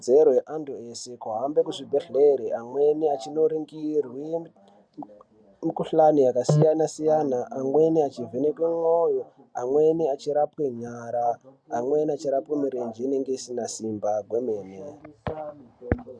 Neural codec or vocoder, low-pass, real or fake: none; 9.9 kHz; real